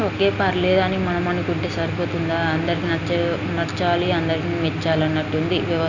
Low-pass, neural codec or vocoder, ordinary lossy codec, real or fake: 7.2 kHz; none; none; real